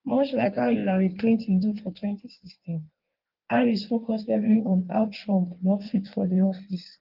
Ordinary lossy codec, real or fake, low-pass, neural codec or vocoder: Opus, 32 kbps; fake; 5.4 kHz; codec, 16 kHz in and 24 kHz out, 1.1 kbps, FireRedTTS-2 codec